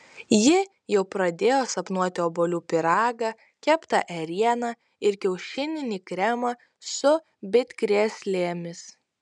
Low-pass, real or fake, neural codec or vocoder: 10.8 kHz; real; none